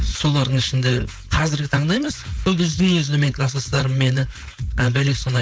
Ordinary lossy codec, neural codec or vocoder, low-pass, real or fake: none; codec, 16 kHz, 4.8 kbps, FACodec; none; fake